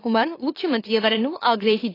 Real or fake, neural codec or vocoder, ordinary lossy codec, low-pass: fake; autoencoder, 44.1 kHz, a latent of 192 numbers a frame, MeloTTS; AAC, 24 kbps; 5.4 kHz